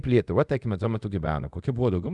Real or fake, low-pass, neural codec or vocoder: fake; 10.8 kHz; codec, 24 kHz, 0.5 kbps, DualCodec